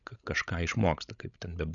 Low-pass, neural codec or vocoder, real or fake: 7.2 kHz; codec, 16 kHz, 16 kbps, FreqCodec, larger model; fake